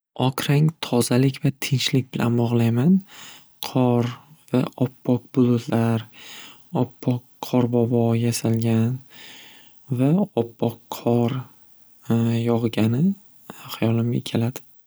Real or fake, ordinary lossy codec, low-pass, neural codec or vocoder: real; none; none; none